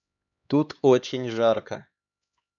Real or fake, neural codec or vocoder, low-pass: fake; codec, 16 kHz, 2 kbps, X-Codec, HuBERT features, trained on LibriSpeech; 7.2 kHz